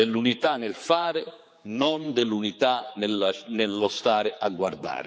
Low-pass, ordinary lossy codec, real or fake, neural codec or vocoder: none; none; fake; codec, 16 kHz, 4 kbps, X-Codec, HuBERT features, trained on general audio